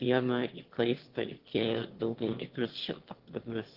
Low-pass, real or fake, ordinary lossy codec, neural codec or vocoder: 5.4 kHz; fake; Opus, 16 kbps; autoencoder, 22.05 kHz, a latent of 192 numbers a frame, VITS, trained on one speaker